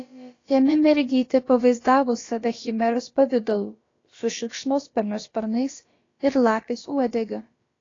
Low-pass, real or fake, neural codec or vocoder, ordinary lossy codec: 7.2 kHz; fake; codec, 16 kHz, about 1 kbps, DyCAST, with the encoder's durations; AAC, 32 kbps